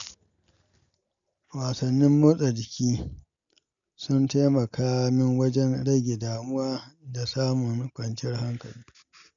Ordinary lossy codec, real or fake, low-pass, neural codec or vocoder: none; real; 7.2 kHz; none